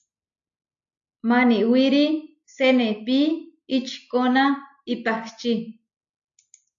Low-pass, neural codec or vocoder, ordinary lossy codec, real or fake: 7.2 kHz; none; AAC, 64 kbps; real